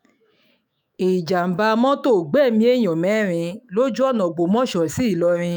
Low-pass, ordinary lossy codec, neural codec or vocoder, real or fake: none; none; autoencoder, 48 kHz, 128 numbers a frame, DAC-VAE, trained on Japanese speech; fake